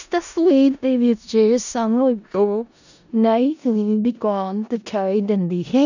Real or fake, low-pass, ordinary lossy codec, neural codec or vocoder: fake; 7.2 kHz; none; codec, 16 kHz in and 24 kHz out, 0.4 kbps, LongCat-Audio-Codec, four codebook decoder